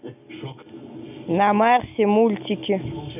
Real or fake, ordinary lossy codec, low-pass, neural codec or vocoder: real; none; 3.6 kHz; none